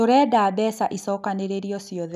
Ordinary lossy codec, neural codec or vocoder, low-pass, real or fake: none; none; 14.4 kHz; real